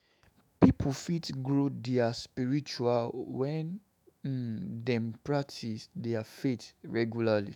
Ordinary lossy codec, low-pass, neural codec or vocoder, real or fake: none; 19.8 kHz; autoencoder, 48 kHz, 128 numbers a frame, DAC-VAE, trained on Japanese speech; fake